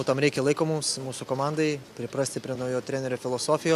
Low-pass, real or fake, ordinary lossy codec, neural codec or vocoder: 14.4 kHz; real; AAC, 96 kbps; none